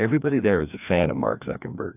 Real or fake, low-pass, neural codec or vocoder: fake; 3.6 kHz; codec, 16 kHz, 2 kbps, FreqCodec, larger model